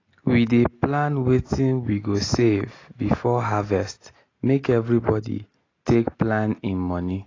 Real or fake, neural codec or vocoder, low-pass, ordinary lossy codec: real; none; 7.2 kHz; AAC, 32 kbps